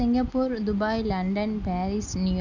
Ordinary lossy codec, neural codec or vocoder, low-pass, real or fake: none; none; 7.2 kHz; real